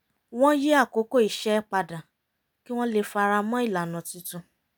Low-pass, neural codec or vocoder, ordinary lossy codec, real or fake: none; none; none; real